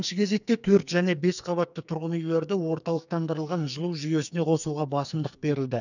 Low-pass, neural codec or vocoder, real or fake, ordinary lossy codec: 7.2 kHz; codec, 44.1 kHz, 2.6 kbps, SNAC; fake; none